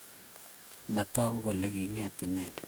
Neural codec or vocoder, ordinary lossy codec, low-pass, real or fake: codec, 44.1 kHz, 2.6 kbps, DAC; none; none; fake